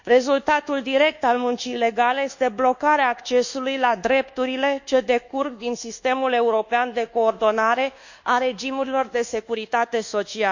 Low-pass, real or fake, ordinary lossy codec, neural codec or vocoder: 7.2 kHz; fake; none; codec, 24 kHz, 1.2 kbps, DualCodec